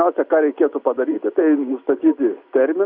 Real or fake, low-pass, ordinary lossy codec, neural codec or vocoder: real; 5.4 kHz; AAC, 48 kbps; none